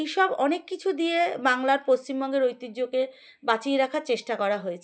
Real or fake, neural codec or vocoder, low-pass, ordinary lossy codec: real; none; none; none